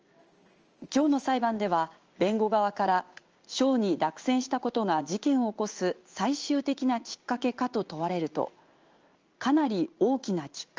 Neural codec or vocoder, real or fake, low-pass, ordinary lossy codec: codec, 16 kHz in and 24 kHz out, 1 kbps, XY-Tokenizer; fake; 7.2 kHz; Opus, 24 kbps